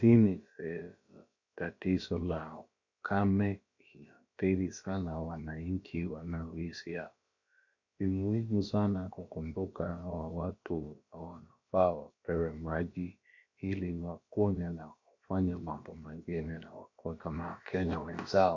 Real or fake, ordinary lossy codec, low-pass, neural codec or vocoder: fake; MP3, 48 kbps; 7.2 kHz; codec, 16 kHz, about 1 kbps, DyCAST, with the encoder's durations